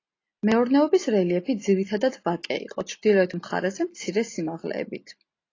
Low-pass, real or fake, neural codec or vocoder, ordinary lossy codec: 7.2 kHz; real; none; AAC, 32 kbps